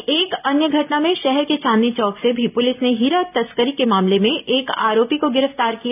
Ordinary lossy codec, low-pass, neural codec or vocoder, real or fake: none; 3.6 kHz; none; real